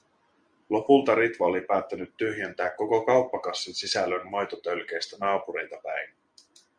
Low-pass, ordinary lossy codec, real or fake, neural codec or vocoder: 9.9 kHz; Opus, 64 kbps; real; none